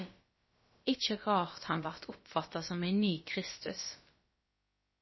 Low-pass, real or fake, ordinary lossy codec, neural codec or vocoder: 7.2 kHz; fake; MP3, 24 kbps; codec, 16 kHz, about 1 kbps, DyCAST, with the encoder's durations